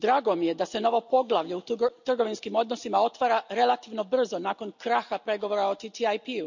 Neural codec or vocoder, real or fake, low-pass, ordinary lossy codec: none; real; 7.2 kHz; none